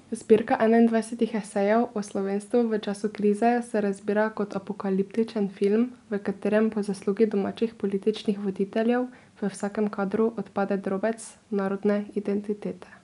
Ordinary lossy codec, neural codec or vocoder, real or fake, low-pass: none; none; real; 10.8 kHz